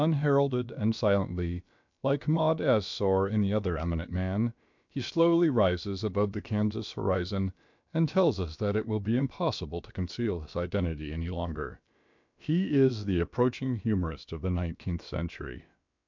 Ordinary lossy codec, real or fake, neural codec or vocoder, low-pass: MP3, 64 kbps; fake; codec, 16 kHz, about 1 kbps, DyCAST, with the encoder's durations; 7.2 kHz